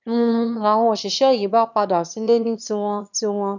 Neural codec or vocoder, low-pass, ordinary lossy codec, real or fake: autoencoder, 22.05 kHz, a latent of 192 numbers a frame, VITS, trained on one speaker; 7.2 kHz; none; fake